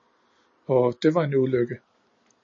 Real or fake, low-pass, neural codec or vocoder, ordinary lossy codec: real; 7.2 kHz; none; MP3, 32 kbps